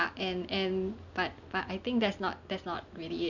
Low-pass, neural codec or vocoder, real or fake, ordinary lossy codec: 7.2 kHz; none; real; none